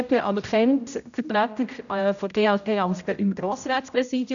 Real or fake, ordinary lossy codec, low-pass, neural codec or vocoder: fake; none; 7.2 kHz; codec, 16 kHz, 0.5 kbps, X-Codec, HuBERT features, trained on general audio